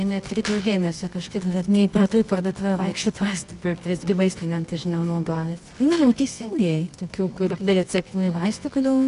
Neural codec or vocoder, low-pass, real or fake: codec, 24 kHz, 0.9 kbps, WavTokenizer, medium music audio release; 10.8 kHz; fake